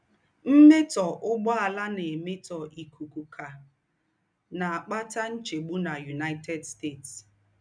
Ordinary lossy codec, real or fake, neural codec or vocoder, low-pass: none; real; none; 9.9 kHz